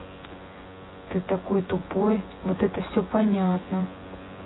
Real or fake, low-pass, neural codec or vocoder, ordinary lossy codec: fake; 7.2 kHz; vocoder, 24 kHz, 100 mel bands, Vocos; AAC, 16 kbps